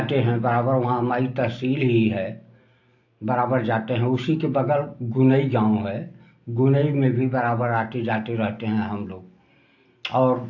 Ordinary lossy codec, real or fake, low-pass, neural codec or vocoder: AAC, 48 kbps; real; 7.2 kHz; none